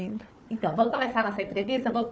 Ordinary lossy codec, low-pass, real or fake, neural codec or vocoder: none; none; fake; codec, 16 kHz, 4 kbps, FunCodec, trained on Chinese and English, 50 frames a second